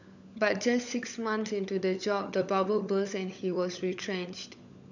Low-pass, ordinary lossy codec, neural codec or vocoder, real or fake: 7.2 kHz; none; codec, 16 kHz, 16 kbps, FunCodec, trained on LibriTTS, 50 frames a second; fake